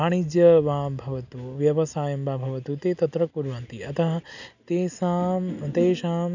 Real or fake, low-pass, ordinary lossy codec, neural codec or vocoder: real; 7.2 kHz; none; none